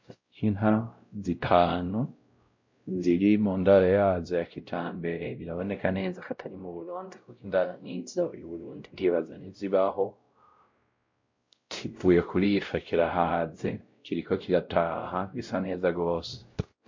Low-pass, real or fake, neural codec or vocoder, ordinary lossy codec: 7.2 kHz; fake; codec, 16 kHz, 0.5 kbps, X-Codec, WavLM features, trained on Multilingual LibriSpeech; MP3, 48 kbps